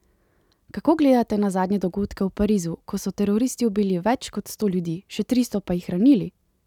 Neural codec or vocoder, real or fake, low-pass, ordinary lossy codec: none; real; 19.8 kHz; none